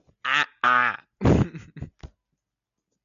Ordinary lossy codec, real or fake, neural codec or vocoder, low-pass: MP3, 96 kbps; real; none; 7.2 kHz